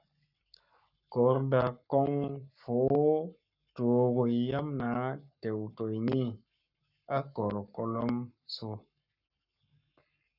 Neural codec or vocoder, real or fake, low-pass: codec, 44.1 kHz, 7.8 kbps, Pupu-Codec; fake; 5.4 kHz